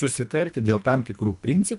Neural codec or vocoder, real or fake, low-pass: codec, 24 kHz, 1.5 kbps, HILCodec; fake; 10.8 kHz